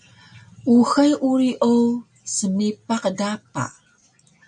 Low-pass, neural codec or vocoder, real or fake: 9.9 kHz; none; real